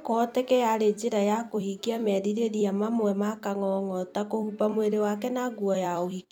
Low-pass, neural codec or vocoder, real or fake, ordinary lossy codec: 19.8 kHz; vocoder, 44.1 kHz, 128 mel bands every 256 samples, BigVGAN v2; fake; none